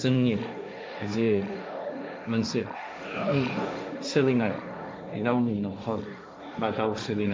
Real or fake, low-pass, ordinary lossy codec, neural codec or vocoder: fake; none; none; codec, 16 kHz, 1.1 kbps, Voila-Tokenizer